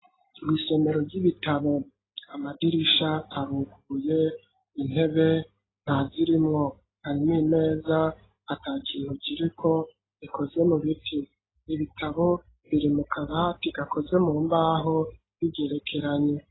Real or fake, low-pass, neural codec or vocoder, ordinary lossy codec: real; 7.2 kHz; none; AAC, 16 kbps